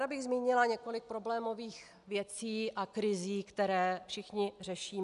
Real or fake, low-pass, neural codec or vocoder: real; 10.8 kHz; none